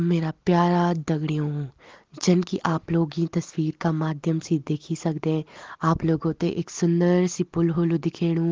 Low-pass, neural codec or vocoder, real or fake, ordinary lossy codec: 7.2 kHz; none; real; Opus, 16 kbps